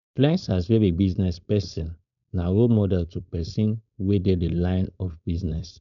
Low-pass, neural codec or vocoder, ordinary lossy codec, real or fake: 7.2 kHz; codec, 16 kHz, 4.8 kbps, FACodec; none; fake